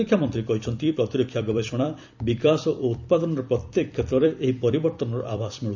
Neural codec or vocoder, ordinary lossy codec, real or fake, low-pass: vocoder, 44.1 kHz, 128 mel bands every 256 samples, BigVGAN v2; none; fake; 7.2 kHz